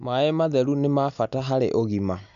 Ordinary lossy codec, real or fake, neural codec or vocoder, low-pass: none; real; none; 7.2 kHz